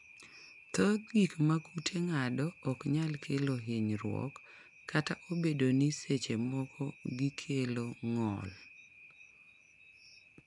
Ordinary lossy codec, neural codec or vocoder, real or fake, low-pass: none; none; real; 10.8 kHz